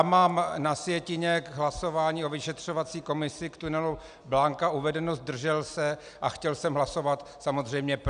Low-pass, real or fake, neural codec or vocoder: 9.9 kHz; real; none